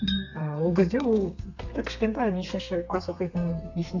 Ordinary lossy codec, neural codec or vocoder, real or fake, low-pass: none; codec, 32 kHz, 1.9 kbps, SNAC; fake; 7.2 kHz